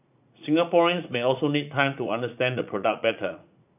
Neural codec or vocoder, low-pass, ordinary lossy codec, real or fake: vocoder, 44.1 kHz, 80 mel bands, Vocos; 3.6 kHz; none; fake